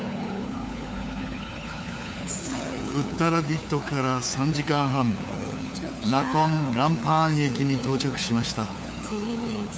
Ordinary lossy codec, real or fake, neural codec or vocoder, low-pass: none; fake; codec, 16 kHz, 4 kbps, FunCodec, trained on LibriTTS, 50 frames a second; none